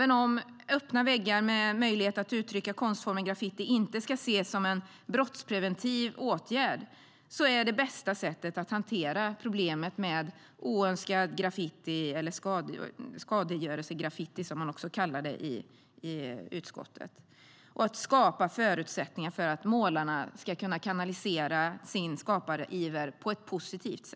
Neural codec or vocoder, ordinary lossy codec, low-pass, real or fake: none; none; none; real